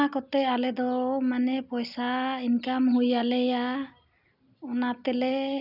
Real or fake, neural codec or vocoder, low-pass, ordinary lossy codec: real; none; 5.4 kHz; none